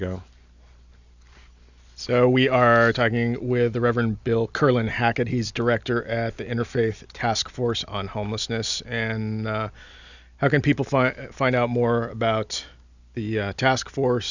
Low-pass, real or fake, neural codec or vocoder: 7.2 kHz; real; none